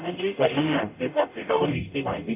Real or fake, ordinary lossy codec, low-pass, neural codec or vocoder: fake; none; 3.6 kHz; codec, 44.1 kHz, 0.9 kbps, DAC